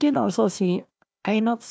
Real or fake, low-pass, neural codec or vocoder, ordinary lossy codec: fake; none; codec, 16 kHz, 1 kbps, FunCodec, trained on Chinese and English, 50 frames a second; none